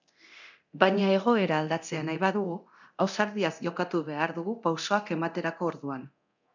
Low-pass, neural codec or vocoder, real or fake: 7.2 kHz; codec, 24 kHz, 0.9 kbps, DualCodec; fake